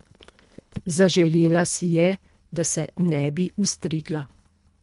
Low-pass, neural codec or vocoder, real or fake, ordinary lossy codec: 10.8 kHz; codec, 24 kHz, 1.5 kbps, HILCodec; fake; MP3, 64 kbps